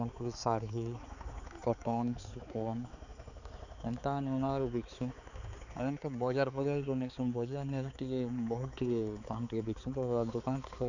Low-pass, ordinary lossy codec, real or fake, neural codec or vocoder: 7.2 kHz; none; fake; codec, 16 kHz, 4 kbps, X-Codec, HuBERT features, trained on balanced general audio